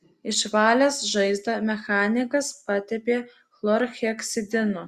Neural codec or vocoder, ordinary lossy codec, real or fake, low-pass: none; Opus, 64 kbps; real; 14.4 kHz